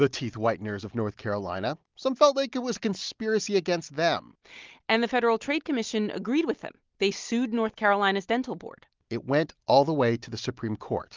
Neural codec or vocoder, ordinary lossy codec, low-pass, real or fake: none; Opus, 32 kbps; 7.2 kHz; real